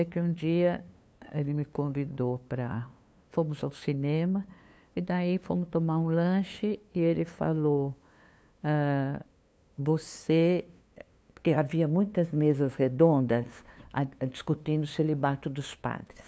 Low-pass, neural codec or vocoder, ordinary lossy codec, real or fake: none; codec, 16 kHz, 2 kbps, FunCodec, trained on LibriTTS, 25 frames a second; none; fake